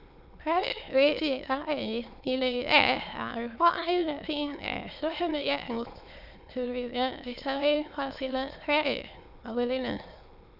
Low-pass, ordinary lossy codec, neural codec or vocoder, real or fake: 5.4 kHz; none; autoencoder, 22.05 kHz, a latent of 192 numbers a frame, VITS, trained on many speakers; fake